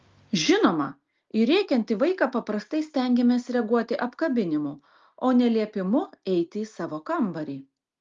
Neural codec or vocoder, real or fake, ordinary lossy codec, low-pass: none; real; Opus, 32 kbps; 7.2 kHz